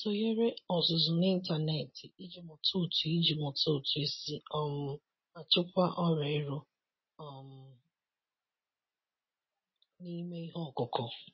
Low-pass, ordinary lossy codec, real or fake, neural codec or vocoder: 7.2 kHz; MP3, 24 kbps; real; none